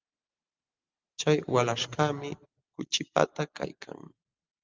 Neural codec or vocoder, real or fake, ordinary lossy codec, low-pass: none; real; Opus, 32 kbps; 7.2 kHz